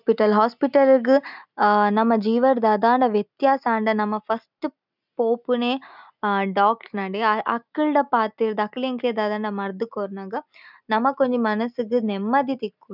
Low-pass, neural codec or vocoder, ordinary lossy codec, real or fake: 5.4 kHz; none; none; real